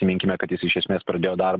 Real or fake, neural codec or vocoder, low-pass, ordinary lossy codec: real; none; 7.2 kHz; Opus, 16 kbps